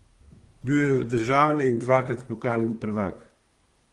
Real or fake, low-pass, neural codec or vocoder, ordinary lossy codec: fake; 10.8 kHz; codec, 24 kHz, 1 kbps, SNAC; Opus, 24 kbps